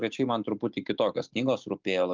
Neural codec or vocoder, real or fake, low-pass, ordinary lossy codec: none; real; 7.2 kHz; Opus, 32 kbps